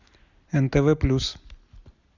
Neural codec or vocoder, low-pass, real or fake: none; 7.2 kHz; real